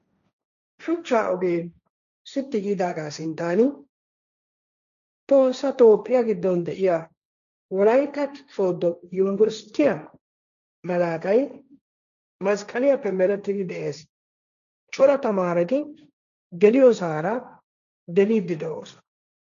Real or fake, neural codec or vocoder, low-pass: fake; codec, 16 kHz, 1.1 kbps, Voila-Tokenizer; 7.2 kHz